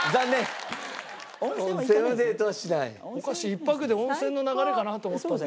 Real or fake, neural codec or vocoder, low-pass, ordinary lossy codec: real; none; none; none